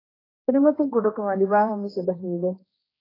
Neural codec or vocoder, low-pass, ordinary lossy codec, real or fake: codec, 16 kHz, 1 kbps, X-Codec, HuBERT features, trained on general audio; 5.4 kHz; AAC, 24 kbps; fake